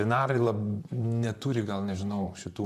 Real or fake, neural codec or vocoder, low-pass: fake; vocoder, 44.1 kHz, 128 mel bands, Pupu-Vocoder; 14.4 kHz